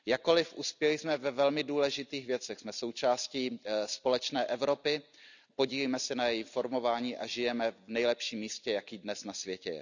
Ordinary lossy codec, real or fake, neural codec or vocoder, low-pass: none; real; none; 7.2 kHz